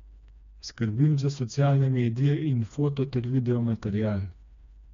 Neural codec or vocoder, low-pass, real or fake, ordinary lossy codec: codec, 16 kHz, 2 kbps, FreqCodec, smaller model; 7.2 kHz; fake; MP3, 64 kbps